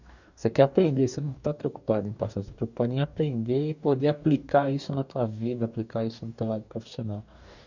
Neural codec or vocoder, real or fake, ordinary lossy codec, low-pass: codec, 44.1 kHz, 2.6 kbps, DAC; fake; none; 7.2 kHz